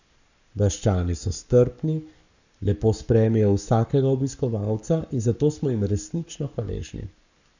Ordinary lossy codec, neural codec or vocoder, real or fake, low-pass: none; codec, 44.1 kHz, 7.8 kbps, Pupu-Codec; fake; 7.2 kHz